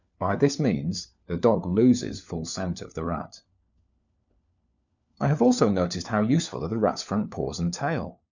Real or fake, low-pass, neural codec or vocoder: fake; 7.2 kHz; codec, 16 kHz, 4 kbps, FunCodec, trained on LibriTTS, 50 frames a second